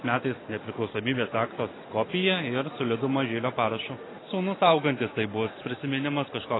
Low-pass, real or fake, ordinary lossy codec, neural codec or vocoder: 7.2 kHz; real; AAC, 16 kbps; none